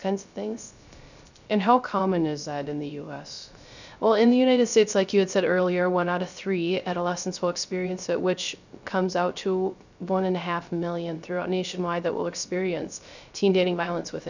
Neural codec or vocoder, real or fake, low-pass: codec, 16 kHz, 0.3 kbps, FocalCodec; fake; 7.2 kHz